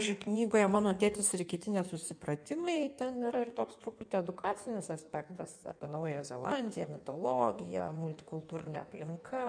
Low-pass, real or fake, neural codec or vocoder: 9.9 kHz; fake; codec, 16 kHz in and 24 kHz out, 1.1 kbps, FireRedTTS-2 codec